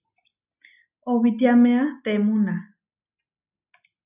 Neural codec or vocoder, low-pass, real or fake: none; 3.6 kHz; real